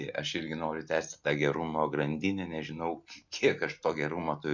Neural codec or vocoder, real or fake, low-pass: none; real; 7.2 kHz